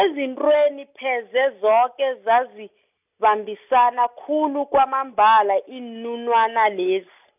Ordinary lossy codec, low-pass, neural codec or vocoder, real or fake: none; 3.6 kHz; none; real